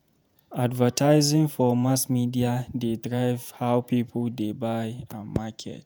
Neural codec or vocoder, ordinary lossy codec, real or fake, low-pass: vocoder, 48 kHz, 128 mel bands, Vocos; none; fake; none